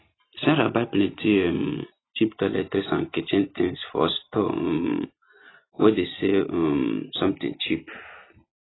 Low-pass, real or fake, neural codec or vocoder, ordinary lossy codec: 7.2 kHz; real; none; AAC, 16 kbps